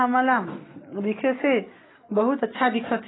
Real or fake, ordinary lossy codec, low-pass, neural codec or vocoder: real; AAC, 16 kbps; 7.2 kHz; none